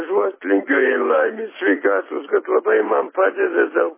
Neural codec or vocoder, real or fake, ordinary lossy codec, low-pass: none; real; MP3, 16 kbps; 3.6 kHz